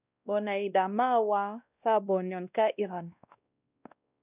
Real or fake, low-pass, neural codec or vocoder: fake; 3.6 kHz; codec, 16 kHz, 1 kbps, X-Codec, WavLM features, trained on Multilingual LibriSpeech